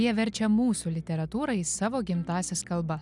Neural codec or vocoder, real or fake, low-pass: none; real; 10.8 kHz